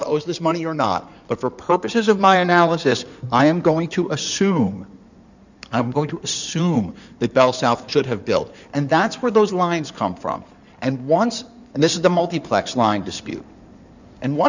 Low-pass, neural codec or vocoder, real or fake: 7.2 kHz; codec, 16 kHz in and 24 kHz out, 2.2 kbps, FireRedTTS-2 codec; fake